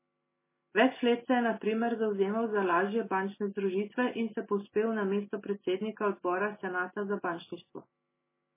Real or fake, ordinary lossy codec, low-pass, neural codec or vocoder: real; MP3, 16 kbps; 3.6 kHz; none